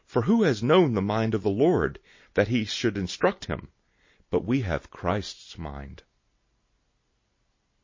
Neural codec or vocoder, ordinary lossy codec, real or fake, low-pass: none; MP3, 32 kbps; real; 7.2 kHz